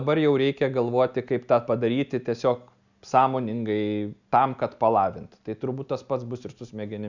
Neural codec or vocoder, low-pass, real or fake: none; 7.2 kHz; real